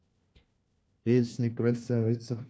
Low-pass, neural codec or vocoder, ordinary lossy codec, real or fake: none; codec, 16 kHz, 1 kbps, FunCodec, trained on LibriTTS, 50 frames a second; none; fake